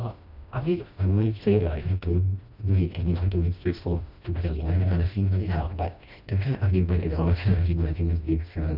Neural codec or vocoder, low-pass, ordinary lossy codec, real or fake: codec, 16 kHz, 1 kbps, FreqCodec, smaller model; 5.4 kHz; none; fake